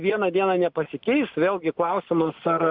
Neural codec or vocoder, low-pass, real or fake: none; 5.4 kHz; real